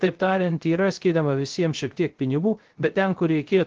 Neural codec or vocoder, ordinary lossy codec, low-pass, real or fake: codec, 16 kHz, 0.3 kbps, FocalCodec; Opus, 16 kbps; 7.2 kHz; fake